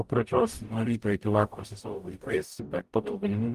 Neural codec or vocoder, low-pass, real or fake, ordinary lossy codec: codec, 44.1 kHz, 0.9 kbps, DAC; 14.4 kHz; fake; Opus, 24 kbps